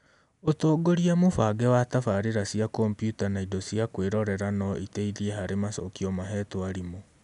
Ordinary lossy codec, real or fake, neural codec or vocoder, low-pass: none; real; none; 10.8 kHz